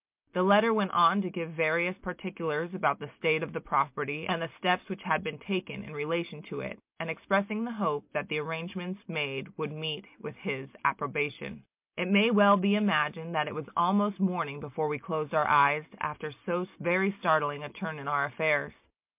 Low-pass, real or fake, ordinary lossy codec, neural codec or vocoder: 3.6 kHz; real; MP3, 32 kbps; none